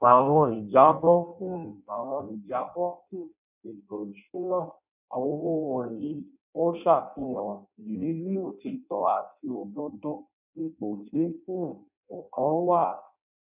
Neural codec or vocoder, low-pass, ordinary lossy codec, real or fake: codec, 16 kHz in and 24 kHz out, 0.6 kbps, FireRedTTS-2 codec; 3.6 kHz; none; fake